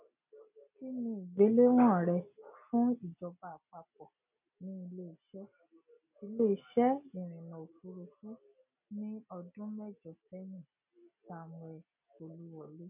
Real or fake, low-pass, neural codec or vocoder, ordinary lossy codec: real; 3.6 kHz; none; none